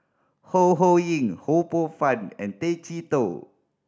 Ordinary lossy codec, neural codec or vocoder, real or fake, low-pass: none; none; real; none